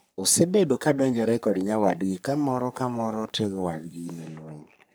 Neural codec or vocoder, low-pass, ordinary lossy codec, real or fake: codec, 44.1 kHz, 3.4 kbps, Pupu-Codec; none; none; fake